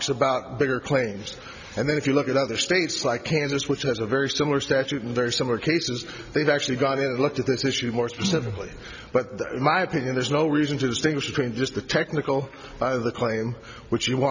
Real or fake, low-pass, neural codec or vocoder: real; 7.2 kHz; none